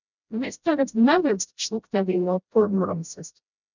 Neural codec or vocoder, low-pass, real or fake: codec, 16 kHz, 0.5 kbps, FreqCodec, smaller model; 7.2 kHz; fake